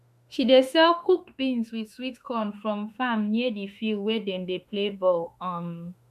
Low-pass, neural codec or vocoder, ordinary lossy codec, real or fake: 14.4 kHz; autoencoder, 48 kHz, 32 numbers a frame, DAC-VAE, trained on Japanese speech; MP3, 96 kbps; fake